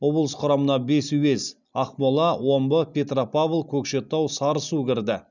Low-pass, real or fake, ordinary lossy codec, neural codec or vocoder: 7.2 kHz; real; none; none